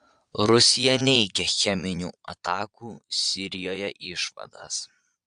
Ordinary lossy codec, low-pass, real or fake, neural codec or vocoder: AAC, 96 kbps; 9.9 kHz; fake; vocoder, 22.05 kHz, 80 mel bands, Vocos